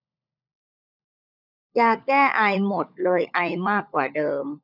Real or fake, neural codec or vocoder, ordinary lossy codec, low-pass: fake; codec, 16 kHz, 16 kbps, FunCodec, trained on LibriTTS, 50 frames a second; none; 5.4 kHz